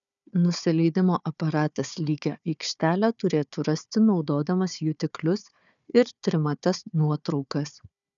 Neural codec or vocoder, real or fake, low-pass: codec, 16 kHz, 4 kbps, FunCodec, trained on Chinese and English, 50 frames a second; fake; 7.2 kHz